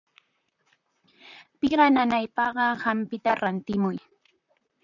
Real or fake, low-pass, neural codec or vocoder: fake; 7.2 kHz; vocoder, 44.1 kHz, 128 mel bands, Pupu-Vocoder